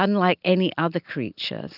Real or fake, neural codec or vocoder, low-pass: real; none; 5.4 kHz